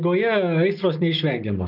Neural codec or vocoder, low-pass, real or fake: none; 5.4 kHz; real